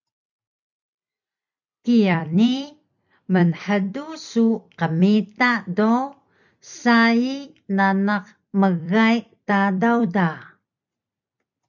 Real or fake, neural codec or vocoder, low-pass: fake; vocoder, 44.1 kHz, 128 mel bands every 256 samples, BigVGAN v2; 7.2 kHz